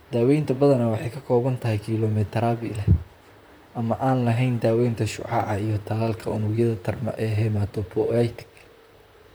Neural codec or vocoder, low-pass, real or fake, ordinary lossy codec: vocoder, 44.1 kHz, 128 mel bands, Pupu-Vocoder; none; fake; none